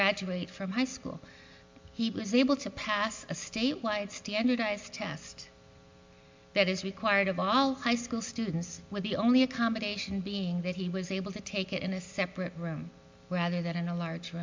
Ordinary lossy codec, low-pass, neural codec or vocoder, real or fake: MP3, 64 kbps; 7.2 kHz; none; real